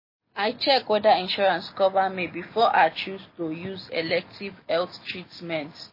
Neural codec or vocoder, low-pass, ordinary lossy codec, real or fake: none; 5.4 kHz; MP3, 24 kbps; real